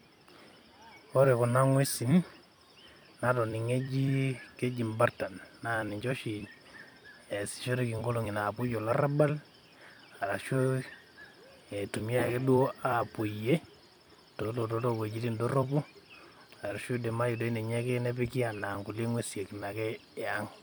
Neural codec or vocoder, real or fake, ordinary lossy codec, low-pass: vocoder, 44.1 kHz, 128 mel bands every 256 samples, BigVGAN v2; fake; none; none